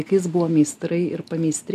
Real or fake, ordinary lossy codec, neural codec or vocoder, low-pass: real; MP3, 96 kbps; none; 14.4 kHz